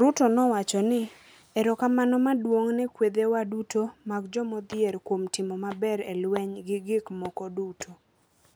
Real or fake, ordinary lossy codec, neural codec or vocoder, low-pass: real; none; none; none